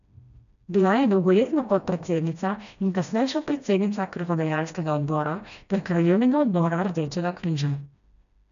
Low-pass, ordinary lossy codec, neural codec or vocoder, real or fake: 7.2 kHz; none; codec, 16 kHz, 1 kbps, FreqCodec, smaller model; fake